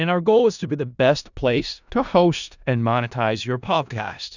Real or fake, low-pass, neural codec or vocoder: fake; 7.2 kHz; codec, 16 kHz in and 24 kHz out, 0.4 kbps, LongCat-Audio-Codec, four codebook decoder